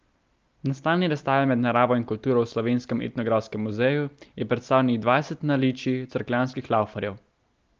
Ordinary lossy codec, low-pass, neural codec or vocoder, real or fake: Opus, 24 kbps; 7.2 kHz; none; real